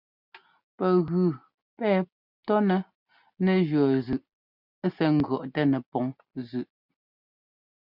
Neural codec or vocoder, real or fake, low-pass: none; real; 5.4 kHz